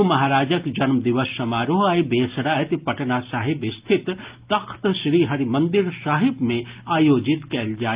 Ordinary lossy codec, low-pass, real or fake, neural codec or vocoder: Opus, 32 kbps; 3.6 kHz; real; none